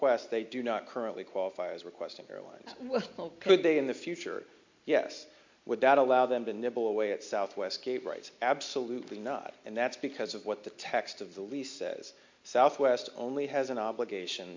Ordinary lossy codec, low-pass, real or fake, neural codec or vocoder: MP3, 48 kbps; 7.2 kHz; real; none